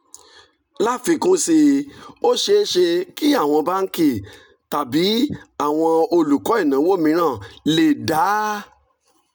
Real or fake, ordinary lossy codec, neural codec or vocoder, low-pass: real; none; none; none